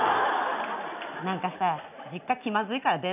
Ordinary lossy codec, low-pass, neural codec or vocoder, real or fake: none; 3.6 kHz; none; real